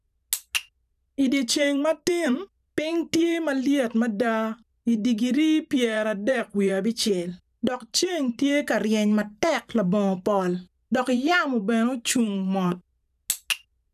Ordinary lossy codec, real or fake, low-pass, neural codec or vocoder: none; fake; 14.4 kHz; vocoder, 44.1 kHz, 128 mel bands every 512 samples, BigVGAN v2